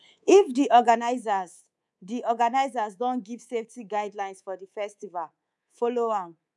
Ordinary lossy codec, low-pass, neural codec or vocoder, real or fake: none; none; codec, 24 kHz, 3.1 kbps, DualCodec; fake